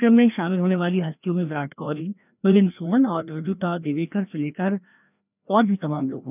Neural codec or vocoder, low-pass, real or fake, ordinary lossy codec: codec, 16 kHz, 1 kbps, FreqCodec, larger model; 3.6 kHz; fake; none